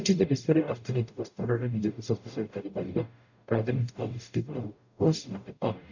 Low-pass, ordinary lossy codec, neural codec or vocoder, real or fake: 7.2 kHz; none; codec, 44.1 kHz, 0.9 kbps, DAC; fake